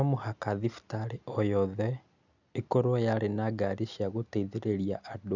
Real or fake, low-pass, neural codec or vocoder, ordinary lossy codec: real; 7.2 kHz; none; none